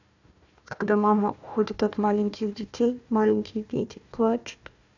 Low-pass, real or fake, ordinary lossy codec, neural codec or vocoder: 7.2 kHz; fake; none; codec, 16 kHz, 1 kbps, FunCodec, trained on Chinese and English, 50 frames a second